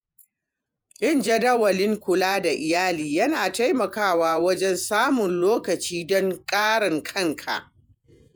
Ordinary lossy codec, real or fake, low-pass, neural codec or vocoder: none; fake; none; vocoder, 48 kHz, 128 mel bands, Vocos